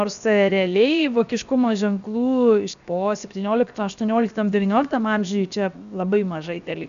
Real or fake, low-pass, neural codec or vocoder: fake; 7.2 kHz; codec, 16 kHz, 0.7 kbps, FocalCodec